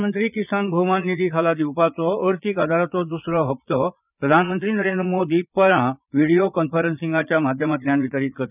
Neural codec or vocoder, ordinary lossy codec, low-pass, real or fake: vocoder, 22.05 kHz, 80 mel bands, Vocos; none; 3.6 kHz; fake